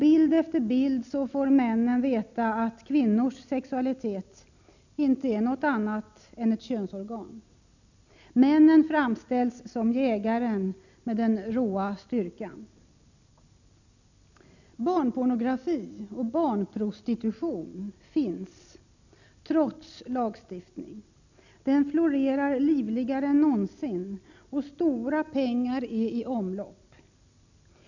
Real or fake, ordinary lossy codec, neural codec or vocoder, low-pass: real; none; none; 7.2 kHz